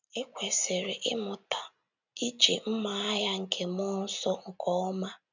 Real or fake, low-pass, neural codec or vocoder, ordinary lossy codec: real; 7.2 kHz; none; none